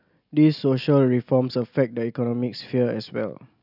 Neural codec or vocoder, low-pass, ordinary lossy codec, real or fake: none; 5.4 kHz; none; real